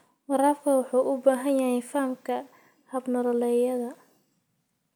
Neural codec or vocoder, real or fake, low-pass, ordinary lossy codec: none; real; none; none